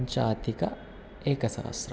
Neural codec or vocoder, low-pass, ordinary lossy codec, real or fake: none; none; none; real